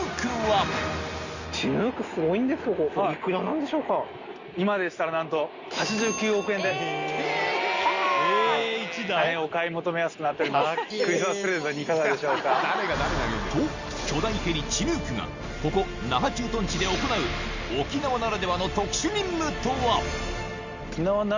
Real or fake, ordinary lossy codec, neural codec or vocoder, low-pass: real; Opus, 64 kbps; none; 7.2 kHz